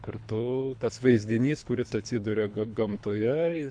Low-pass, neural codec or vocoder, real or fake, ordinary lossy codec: 9.9 kHz; codec, 24 kHz, 3 kbps, HILCodec; fake; AAC, 64 kbps